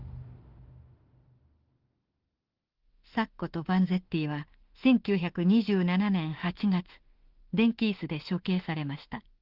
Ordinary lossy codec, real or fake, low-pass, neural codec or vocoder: Opus, 16 kbps; fake; 5.4 kHz; autoencoder, 48 kHz, 32 numbers a frame, DAC-VAE, trained on Japanese speech